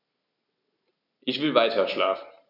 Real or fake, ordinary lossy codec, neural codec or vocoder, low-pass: real; none; none; 5.4 kHz